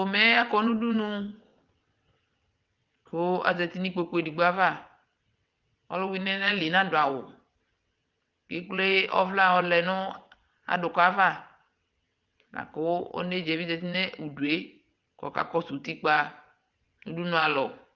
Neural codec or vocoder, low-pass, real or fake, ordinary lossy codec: vocoder, 22.05 kHz, 80 mel bands, WaveNeXt; 7.2 kHz; fake; Opus, 32 kbps